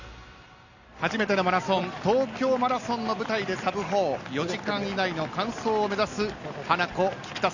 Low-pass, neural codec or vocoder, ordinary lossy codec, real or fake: 7.2 kHz; none; none; real